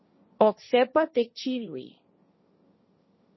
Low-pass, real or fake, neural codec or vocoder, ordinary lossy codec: 7.2 kHz; fake; codec, 16 kHz, 1.1 kbps, Voila-Tokenizer; MP3, 24 kbps